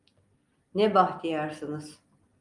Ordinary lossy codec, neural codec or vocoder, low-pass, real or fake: Opus, 32 kbps; none; 10.8 kHz; real